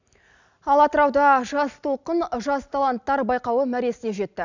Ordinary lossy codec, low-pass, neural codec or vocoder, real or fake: none; 7.2 kHz; none; real